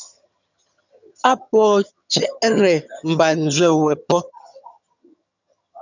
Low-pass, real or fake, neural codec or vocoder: 7.2 kHz; fake; vocoder, 22.05 kHz, 80 mel bands, HiFi-GAN